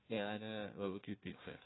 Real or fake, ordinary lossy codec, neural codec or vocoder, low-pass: fake; AAC, 16 kbps; codec, 16 kHz, 1 kbps, FunCodec, trained on Chinese and English, 50 frames a second; 7.2 kHz